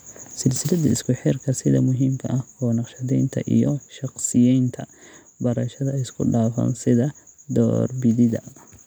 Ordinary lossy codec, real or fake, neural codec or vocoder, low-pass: none; real; none; none